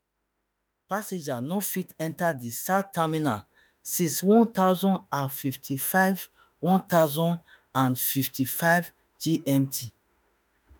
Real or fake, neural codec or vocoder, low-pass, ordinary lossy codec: fake; autoencoder, 48 kHz, 32 numbers a frame, DAC-VAE, trained on Japanese speech; none; none